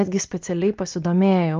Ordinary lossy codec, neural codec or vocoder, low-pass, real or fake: Opus, 32 kbps; none; 7.2 kHz; real